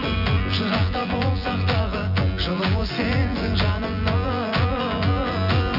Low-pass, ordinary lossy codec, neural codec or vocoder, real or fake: 5.4 kHz; Opus, 64 kbps; vocoder, 24 kHz, 100 mel bands, Vocos; fake